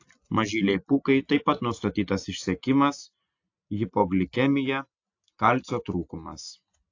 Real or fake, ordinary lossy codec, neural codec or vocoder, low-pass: real; AAC, 48 kbps; none; 7.2 kHz